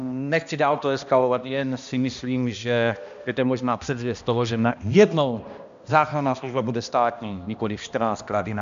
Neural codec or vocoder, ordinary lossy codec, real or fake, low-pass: codec, 16 kHz, 1 kbps, X-Codec, HuBERT features, trained on balanced general audio; MP3, 64 kbps; fake; 7.2 kHz